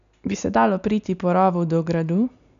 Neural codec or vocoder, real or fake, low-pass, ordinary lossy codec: none; real; 7.2 kHz; none